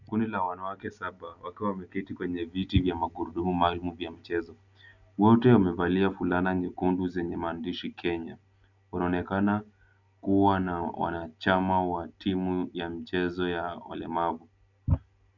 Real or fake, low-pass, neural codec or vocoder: real; 7.2 kHz; none